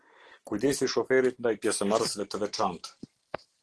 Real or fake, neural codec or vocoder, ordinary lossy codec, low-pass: real; none; Opus, 16 kbps; 10.8 kHz